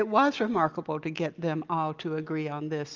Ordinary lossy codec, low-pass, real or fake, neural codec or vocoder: Opus, 24 kbps; 7.2 kHz; real; none